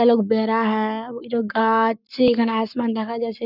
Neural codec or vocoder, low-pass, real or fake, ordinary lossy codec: codec, 44.1 kHz, 7.8 kbps, Pupu-Codec; 5.4 kHz; fake; none